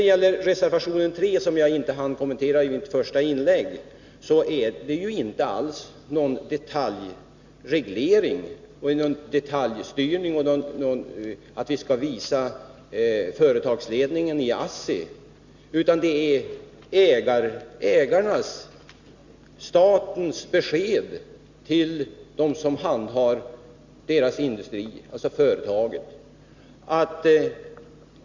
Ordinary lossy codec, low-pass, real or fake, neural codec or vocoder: Opus, 64 kbps; 7.2 kHz; real; none